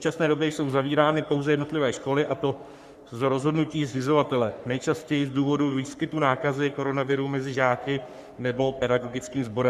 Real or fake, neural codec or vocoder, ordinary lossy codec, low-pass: fake; codec, 44.1 kHz, 3.4 kbps, Pupu-Codec; Opus, 64 kbps; 14.4 kHz